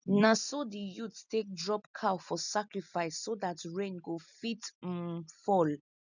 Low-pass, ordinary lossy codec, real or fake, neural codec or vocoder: 7.2 kHz; none; real; none